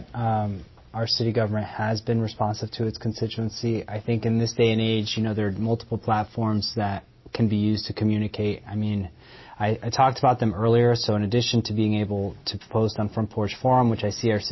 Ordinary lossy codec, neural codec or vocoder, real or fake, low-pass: MP3, 24 kbps; none; real; 7.2 kHz